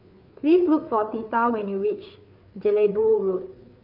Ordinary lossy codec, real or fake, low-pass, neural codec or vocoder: none; fake; 5.4 kHz; codec, 16 kHz, 4 kbps, FreqCodec, larger model